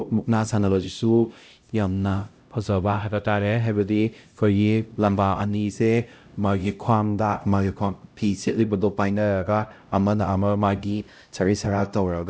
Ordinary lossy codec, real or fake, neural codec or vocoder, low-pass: none; fake; codec, 16 kHz, 0.5 kbps, X-Codec, HuBERT features, trained on LibriSpeech; none